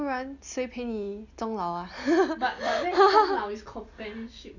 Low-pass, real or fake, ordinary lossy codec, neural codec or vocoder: 7.2 kHz; real; none; none